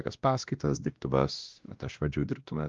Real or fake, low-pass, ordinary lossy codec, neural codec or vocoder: fake; 7.2 kHz; Opus, 32 kbps; codec, 16 kHz, 1 kbps, X-Codec, WavLM features, trained on Multilingual LibriSpeech